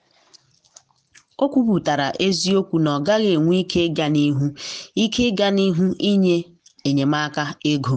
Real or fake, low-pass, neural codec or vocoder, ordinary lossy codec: real; 9.9 kHz; none; none